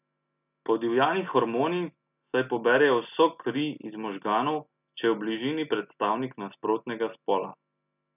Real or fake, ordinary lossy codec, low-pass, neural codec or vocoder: real; none; 3.6 kHz; none